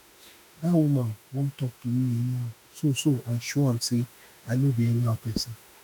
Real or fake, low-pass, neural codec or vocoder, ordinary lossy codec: fake; none; autoencoder, 48 kHz, 32 numbers a frame, DAC-VAE, trained on Japanese speech; none